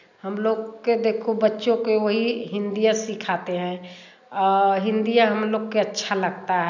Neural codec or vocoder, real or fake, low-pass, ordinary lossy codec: none; real; 7.2 kHz; none